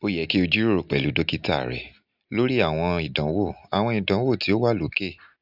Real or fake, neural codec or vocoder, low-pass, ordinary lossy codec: real; none; 5.4 kHz; none